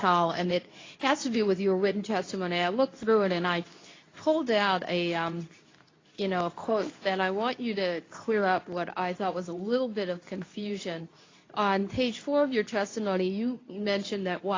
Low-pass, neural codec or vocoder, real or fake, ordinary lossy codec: 7.2 kHz; codec, 24 kHz, 0.9 kbps, WavTokenizer, medium speech release version 2; fake; AAC, 32 kbps